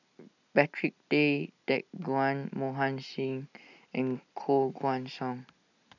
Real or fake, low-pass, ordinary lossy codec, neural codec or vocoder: real; 7.2 kHz; none; none